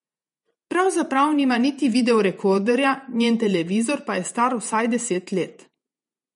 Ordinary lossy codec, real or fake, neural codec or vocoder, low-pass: MP3, 48 kbps; fake; vocoder, 48 kHz, 128 mel bands, Vocos; 19.8 kHz